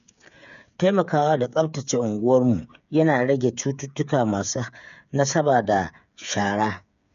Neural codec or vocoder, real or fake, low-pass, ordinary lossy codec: codec, 16 kHz, 8 kbps, FreqCodec, smaller model; fake; 7.2 kHz; none